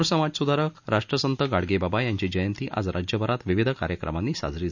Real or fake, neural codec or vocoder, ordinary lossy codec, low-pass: real; none; none; 7.2 kHz